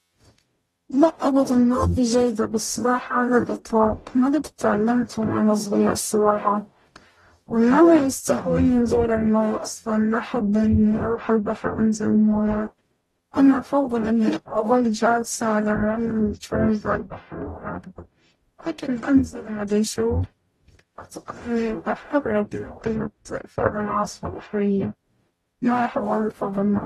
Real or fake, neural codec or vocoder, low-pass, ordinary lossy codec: fake; codec, 44.1 kHz, 0.9 kbps, DAC; 19.8 kHz; AAC, 32 kbps